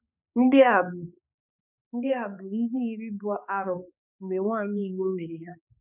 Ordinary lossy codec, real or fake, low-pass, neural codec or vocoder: none; fake; 3.6 kHz; codec, 16 kHz, 2 kbps, X-Codec, HuBERT features, trained on balanced general audio